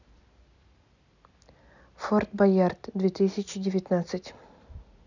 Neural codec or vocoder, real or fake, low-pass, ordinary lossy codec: none; real; 7.2 kHz; none